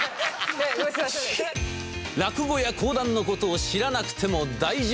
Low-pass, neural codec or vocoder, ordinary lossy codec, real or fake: none; none; none; real